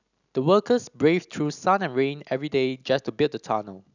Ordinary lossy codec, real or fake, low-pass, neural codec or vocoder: none; real; 7.2 kHz; none